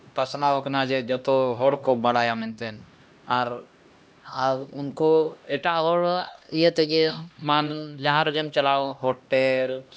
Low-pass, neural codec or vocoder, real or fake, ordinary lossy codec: none; codec, 16 kHz, 1 kbps, X-Codec, HuBERT features, trained on LibriSpeech; fake; none